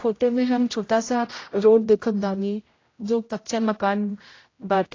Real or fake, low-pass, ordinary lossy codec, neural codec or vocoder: fake; 7.2 kHz; AAC, 32 kbps; codec, 16 kHz, 0.5 kbps, X-Codec, HuBERT features, trained on general audio